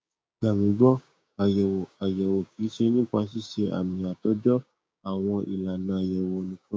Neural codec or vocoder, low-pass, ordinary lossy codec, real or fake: codec, 16 kHz, 6 kbps, DAC; none; none; fake